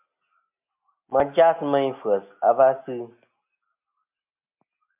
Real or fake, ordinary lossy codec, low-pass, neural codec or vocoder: real; MP3, 32 kbps; 3.6 kHz; none